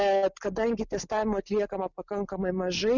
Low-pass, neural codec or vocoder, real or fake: 7.2 kHz; none; real